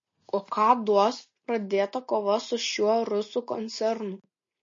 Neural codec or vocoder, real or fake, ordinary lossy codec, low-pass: none; real; MP3, 32 kbps; 7.2 kHz